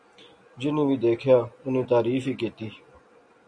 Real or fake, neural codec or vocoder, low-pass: real; none; 9.9 kHz